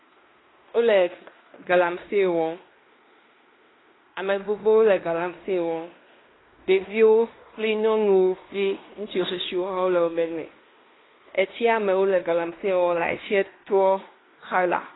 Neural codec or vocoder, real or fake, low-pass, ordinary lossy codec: codec, 16 kHz in and 24 kHz out, 0.9 kbps, LongCat-Audio-Codec, fine tuned four codebook decoder; fake; 7.2 kHz; AAC, 16 kbps